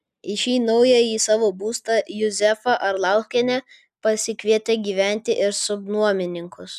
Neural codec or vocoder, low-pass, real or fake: none; 14.4 kHz; real